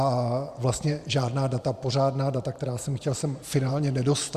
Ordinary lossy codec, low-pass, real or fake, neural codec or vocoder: Opus, 64 kbps; 14.4 kHz; fake; vocoder, 44.1 kHz, 128 mel bands every 512 samples, BigVGAN v2